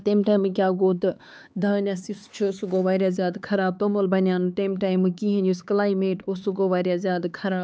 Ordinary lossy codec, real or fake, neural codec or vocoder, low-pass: none; fake; codec, 16 kHz, 4 kbps, X-Codec, HuBERT features, trained on LibriSpeech; none